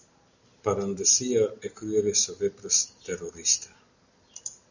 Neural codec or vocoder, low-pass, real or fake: none; 7.2 kHz; real